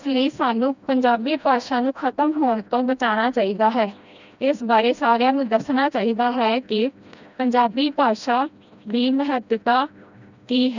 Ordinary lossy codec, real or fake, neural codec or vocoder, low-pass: none; fake; codec, 16 kHz, 1 kbps, FreqCodec, smaller model; 7.2 kHz